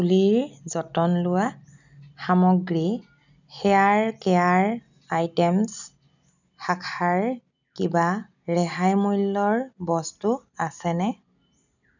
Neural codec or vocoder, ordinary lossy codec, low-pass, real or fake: none; none; 7.2 kHz; real